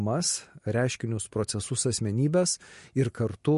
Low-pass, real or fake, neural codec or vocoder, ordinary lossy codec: 14.4 kHz; real; none; MP3, 48 kbps